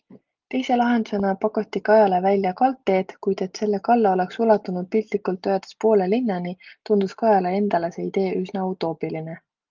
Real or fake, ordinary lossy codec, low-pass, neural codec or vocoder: real; Opus, 32 kbps; 7.2 kHz; none